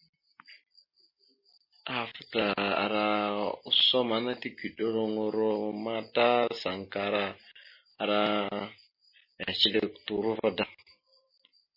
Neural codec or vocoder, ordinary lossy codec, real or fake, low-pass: none; MP3, 24 kbps; real; 5.4 kHz